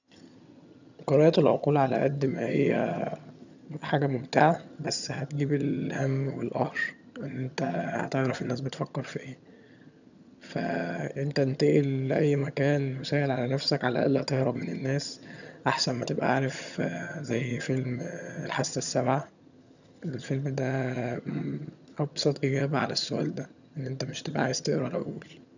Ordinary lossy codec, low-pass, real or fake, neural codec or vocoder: none; 7.2 kHz; fake; vocoder, 22.05 kHz, 80 mel bands, HiFi-GAN